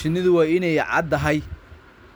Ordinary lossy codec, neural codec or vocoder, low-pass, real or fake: none; none; none; real